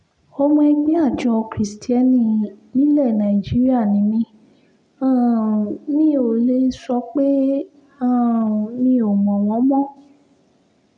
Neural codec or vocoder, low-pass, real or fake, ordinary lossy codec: none; 9.9 kHz; real; none